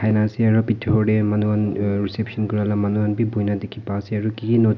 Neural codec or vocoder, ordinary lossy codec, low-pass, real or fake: none; none; 7.2 kHz; real